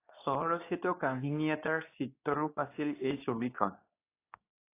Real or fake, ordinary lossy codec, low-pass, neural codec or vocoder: fake; AAC, 24 kbps; 3.6 kHz; codec, 24 kHz, 0.9 kbps, WavTokenizer, medium speech release version 2